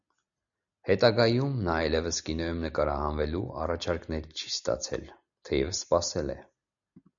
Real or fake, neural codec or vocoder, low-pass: real; none; 7.2 kHz